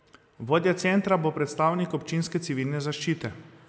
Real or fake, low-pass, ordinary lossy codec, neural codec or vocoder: real; none; none; none